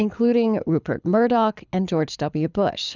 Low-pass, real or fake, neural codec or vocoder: 7.2 kHz; fake; codec, 24 kHz, 6 kbps, HILCodec